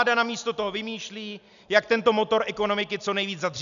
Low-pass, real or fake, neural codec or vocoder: 7.2 kHz; real; none